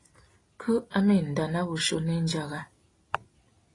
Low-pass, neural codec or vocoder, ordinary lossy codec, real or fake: 10.8 kHz; none; AAC, 32 kbps; real